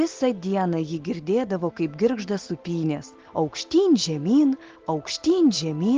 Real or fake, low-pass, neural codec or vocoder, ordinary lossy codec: real; 7.2 kHz; none; Opus, 16 kbps